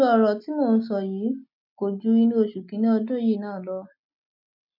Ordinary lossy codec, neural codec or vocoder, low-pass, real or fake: MP3, 48 kbps; none; 5.4 kHz; real